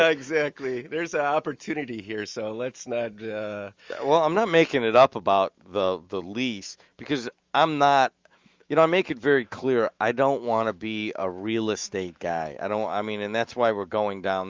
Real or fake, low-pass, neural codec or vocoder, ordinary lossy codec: fake; 7.2 kHz; autoencoder, 48 kHz, 128 numbers a frame, DAC-VAE, trained on Japanese speech; Opus, 32 kbps